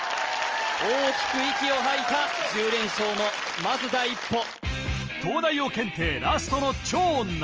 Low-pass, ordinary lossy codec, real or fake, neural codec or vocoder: 7.2 kHz; Opus, 24 kbps; real; none